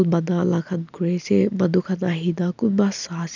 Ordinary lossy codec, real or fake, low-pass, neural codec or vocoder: none; real; 7.2 kHz; none